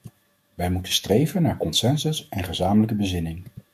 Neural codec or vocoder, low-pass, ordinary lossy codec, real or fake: autoencoder, 48 kHz, 128 numbers a frame, DAC-VAE, trained on Japanese speech; 14.4 kHz; MP3, 64 kbps; fake